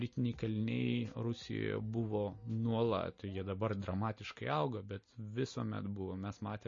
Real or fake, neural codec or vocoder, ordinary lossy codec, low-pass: real; none; MP3, 32 kbps; 7.2 kHz